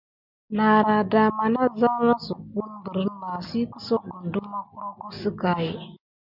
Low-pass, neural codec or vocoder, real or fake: 5.4 kHz; none; real